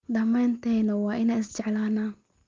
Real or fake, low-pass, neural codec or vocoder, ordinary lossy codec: real; 7.2 kHz; none; Opus, 24 kbps